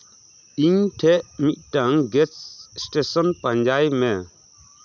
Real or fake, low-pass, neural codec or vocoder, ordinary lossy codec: real; 7.2 kHz; none; none